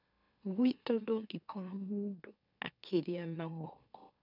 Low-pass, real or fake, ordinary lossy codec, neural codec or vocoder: 5.4 kHz; fake; none; autoencoder, 44.1 kHz, a latent of 192 numbers a frame, MeloTTS